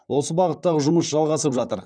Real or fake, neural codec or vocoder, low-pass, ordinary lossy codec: fake; vocoder, 44.1 kHz, 128 mel bands, Pupu-Vocoder; 9.9 kHz; none